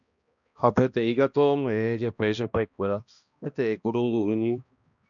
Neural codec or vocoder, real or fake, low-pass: codec, 16 kHz, 1 kbps, X-Codec, HuBERT features, trained on balanced general audio; fake; 7.2 kHz